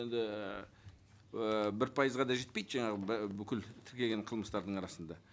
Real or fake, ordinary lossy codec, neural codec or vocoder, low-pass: real; none; none; none